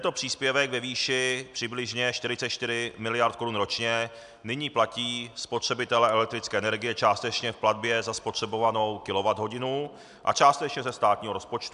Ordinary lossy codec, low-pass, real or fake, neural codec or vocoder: MP3, 96 kbps; 10.8 kHz; real; none